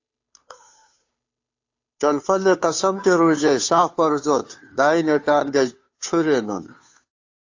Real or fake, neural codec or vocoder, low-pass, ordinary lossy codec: fake; codec, 16 kHz, 2 kbps, FunCodec, trained on Chinese and English, 25 frames a second; 7.2 kHz; AAC, 48 kbps